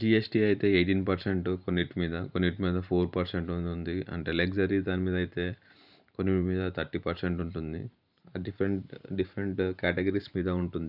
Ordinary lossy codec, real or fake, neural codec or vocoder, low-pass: none; fake; vocoder, 44.1 kHz, 128 mel bands every 512 samples, BigVGAN v2; 5.4 kHz